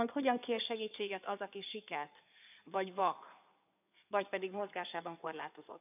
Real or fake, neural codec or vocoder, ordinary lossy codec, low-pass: fake; codec, 16 kHz in and 24 kHz out, 2.2 kbps, FireRedTTS-2 codec; none; 3.6 kHz